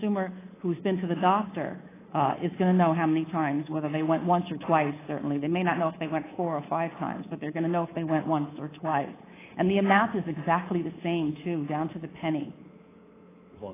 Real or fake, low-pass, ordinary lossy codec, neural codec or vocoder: fake; 3.6 kHz; AAC, 16 kbps; codec, 24 kHz, 3.1 kbps, DualCodec